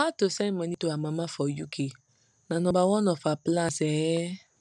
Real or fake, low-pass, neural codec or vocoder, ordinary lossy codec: real; none; none; none